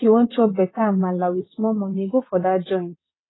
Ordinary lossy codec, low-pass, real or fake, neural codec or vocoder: AAC, 16 kbps; 7.2 kHz; fake; vocoder, 22.05 kHz, 80 mel bands, WaveNeXt